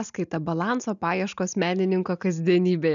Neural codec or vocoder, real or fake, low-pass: none; real; 7.2 kHz